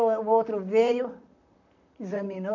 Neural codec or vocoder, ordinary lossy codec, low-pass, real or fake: vocoder, 44.1 kHz, 128 mel bands, Pupu-Vocoder; none; 7.2 kHz; fake